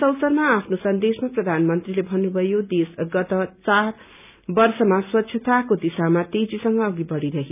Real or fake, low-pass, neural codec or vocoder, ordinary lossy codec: real; 3.6 kHz; none; none